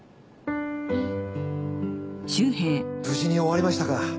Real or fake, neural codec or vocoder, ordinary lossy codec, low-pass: real; none; none; none